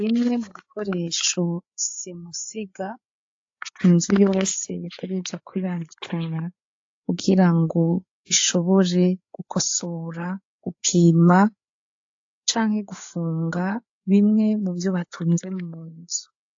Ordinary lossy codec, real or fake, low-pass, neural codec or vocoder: AAC, 48 kbps; fake; 7.2 kHz; codec, 16 kHz, 4 kbps, FreqCodec, larger model